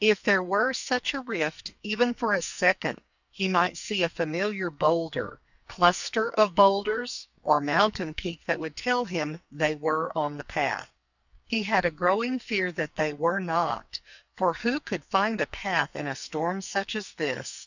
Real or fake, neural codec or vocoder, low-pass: fake; codec, 44.1 kHz, 2.6 kbps, SNAC; 7.2 kHz